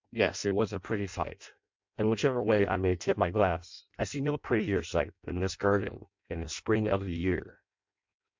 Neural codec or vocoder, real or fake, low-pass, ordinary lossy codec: codec, 16 kHz in and 24 kHz out, 0.6 kbps, FireRedTTS-2 codec; fake; 7.2 kHz; MP3, 64 kbps